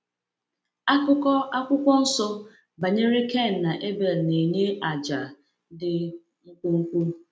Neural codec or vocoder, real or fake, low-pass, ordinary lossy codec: none; real; none; none